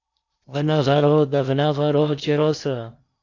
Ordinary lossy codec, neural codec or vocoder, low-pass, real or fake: AAC, 48 kbps; codec, 16 kHz in and 24 kHz out, 0.8 kbps, FocalCodec, streaming, 65536 codes; 7.2 kHz; fake